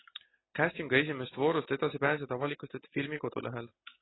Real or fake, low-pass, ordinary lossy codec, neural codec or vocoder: real; 7.2 kHz; AAC, 16 kbps; none